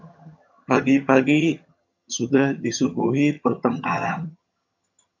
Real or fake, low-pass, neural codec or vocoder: fake; 7.2 kHz; vocoder, 22.05 kHz, 80 mel bands, HiFi-GAN